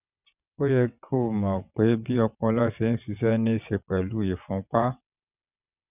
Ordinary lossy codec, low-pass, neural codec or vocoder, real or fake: none; 3.6 kHz; vocoder, 22.05 kHz, 80 mel bands, WaveNeXt; fake